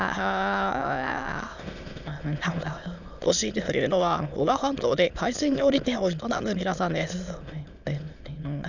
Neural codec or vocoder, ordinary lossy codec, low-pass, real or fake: autoencoder, 22.05 kHz, a latent of 192 numbers a frame, VITS, trained on many speakers; none; 7.2 kHz; fake